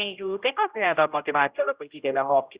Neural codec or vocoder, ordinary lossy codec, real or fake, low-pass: codec, 16 kHz, 0.5 kbps, X-Codec, HuBERT features, trained on general audio; Opus, 64 kbps; fake; 3.6 kHz